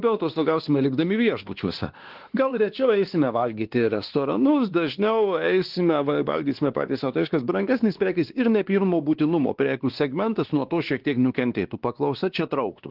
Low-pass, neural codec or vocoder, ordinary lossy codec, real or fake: 5.4 kHz; codec, 16 kHz, 2 kbps, X-Codec, WavLM features, trained on Multilingual LibriSpeech; Opus, 16 kbps; fake